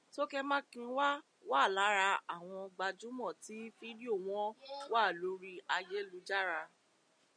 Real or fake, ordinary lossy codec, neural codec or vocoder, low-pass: real; MP3, 96 kbps; none; 9.9 kHz